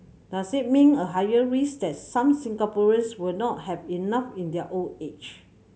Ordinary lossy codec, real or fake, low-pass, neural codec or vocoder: none; real; none; none